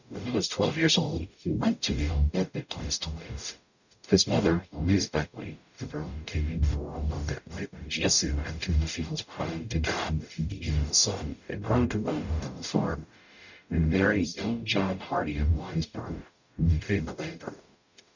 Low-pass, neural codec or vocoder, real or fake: 7.2 kHz; codec, 44.1 kHz, 0.9 kbps, DAC; fake